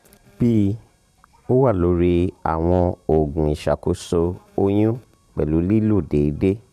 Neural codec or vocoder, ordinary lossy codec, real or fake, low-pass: vocoder, 44.1 kHz, 128 mel bands every 512 samples, BigVGAN v2; none; fake; 14.4 kHz